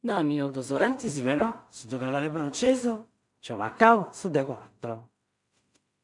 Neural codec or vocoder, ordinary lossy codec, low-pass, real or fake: codec, 16 kHz in and 24 kHz out, 0.4 kbps, LongCat-Audio-Codec, two codebook decoder; MP3, 96 kbps; 10.8 kHz; fake